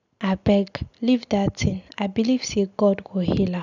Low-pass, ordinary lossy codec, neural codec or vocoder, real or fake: 7.2 kHz; none; none; real